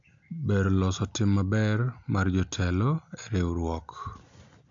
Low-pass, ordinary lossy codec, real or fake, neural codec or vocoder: 7.2 kHz; AAC, 64 kbps; real; none